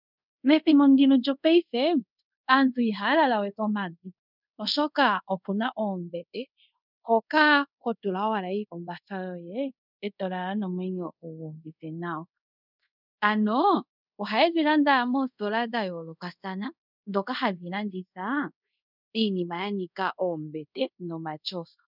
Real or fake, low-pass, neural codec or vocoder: fake; 5.4 kHz; codec, 24 kHz, 0.5 kbps, DualCodec